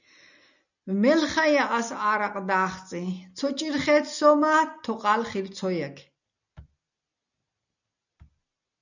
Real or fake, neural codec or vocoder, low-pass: real; none; 7.2 kHz